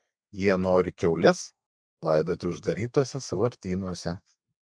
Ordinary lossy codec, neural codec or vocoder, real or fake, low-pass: MP3, 64 kbps; codec, 32 kHz, 1.9 kbps, SNAC; fake; 9.9 kHz